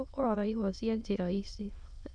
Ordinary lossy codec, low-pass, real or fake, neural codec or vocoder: none; 9.9 kHz; fake; autoencoder, 22.05 kHz, a latent of 192 numbers a frame, VITS, trained on many speakers